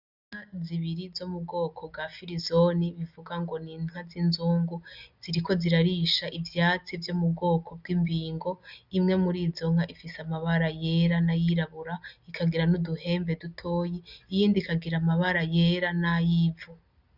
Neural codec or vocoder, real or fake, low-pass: none; real; 5.4 kHz